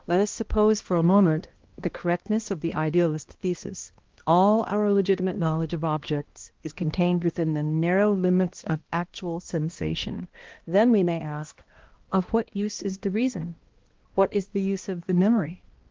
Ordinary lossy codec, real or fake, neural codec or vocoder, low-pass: Opus, 16 kbps; fake; codec, 16 kHz, 1 kbps, X-Codec, HuBERT features, trained on balanced general audio; 7.2 kHz